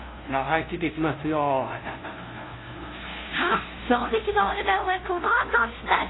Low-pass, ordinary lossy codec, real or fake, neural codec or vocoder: 7.2 kHz; AAC, 16 kbps; fake; codec, 16 kHz, 0.5 kbps, FunCodec, trained on LibriTTS, 25 frames a second